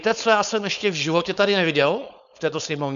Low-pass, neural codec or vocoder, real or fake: 7.2 kHz; codec, 16 kHz, 4.8 kbps, FACodec; fake